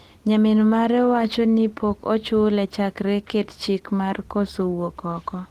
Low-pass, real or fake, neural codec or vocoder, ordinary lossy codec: 14.4 kHz; real; none; Opus, 16 kbps